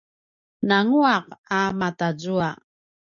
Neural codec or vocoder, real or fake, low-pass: none; real; 7.2 kHz